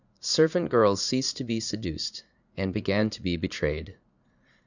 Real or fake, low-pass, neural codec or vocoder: fake; 7.2 kHz; vocoder, 44.1 kHz, 80 mel bands, Vocos